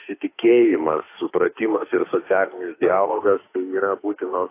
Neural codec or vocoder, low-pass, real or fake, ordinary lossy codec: autoencoder, 48 kHz, 32 numbers a frame, DAC-VAE, trained on Japanese speech; 3.6 kHz; fake; AAC, 24 kbps